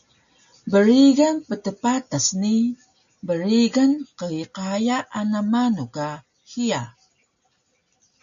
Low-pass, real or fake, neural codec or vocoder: 7.2 kHz; real; none